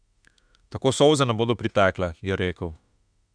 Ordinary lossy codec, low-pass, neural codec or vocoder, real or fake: none; 9.9 kHz; autoencoder, 48 kHz, 32 numbers a frame, DAC-VAE, trained on Japanese speech; fake